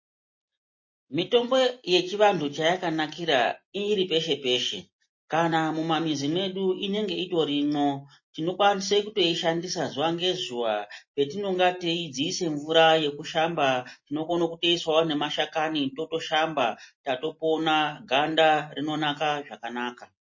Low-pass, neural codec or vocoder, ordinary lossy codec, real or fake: 7.2 kHz; none; MP3, 32 kbps; real